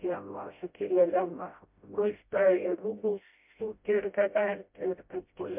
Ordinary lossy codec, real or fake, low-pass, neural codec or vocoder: MP3, 32 kbps; fake; 3.6 kHz; codec, 16 kHz, 0.5 kbps, FreqCodec, smaller model